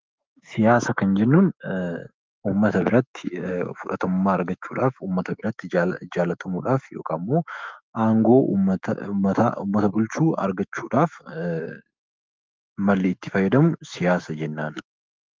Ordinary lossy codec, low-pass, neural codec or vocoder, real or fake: Opus, 24 kbps; 7.2 kHz; autoencoder, 48 kHz, 128 numbers a frame, DAC-VAE, trained on Japanese speech; fake